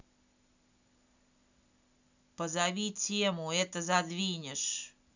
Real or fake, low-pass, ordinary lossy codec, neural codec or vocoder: real; 7.2 kHz; none; none